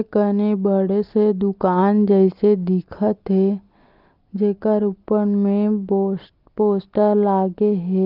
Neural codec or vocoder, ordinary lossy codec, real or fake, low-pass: none; Opus, 32 kbps; real; 5.4 kHz